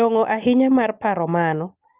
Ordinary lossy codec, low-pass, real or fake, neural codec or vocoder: Opus, 32 kbps; 3.6 kHz; real; none